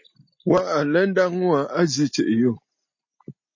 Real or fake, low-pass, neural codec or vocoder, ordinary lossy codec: real; 7.2 kHz; none; MP3, 32 kbps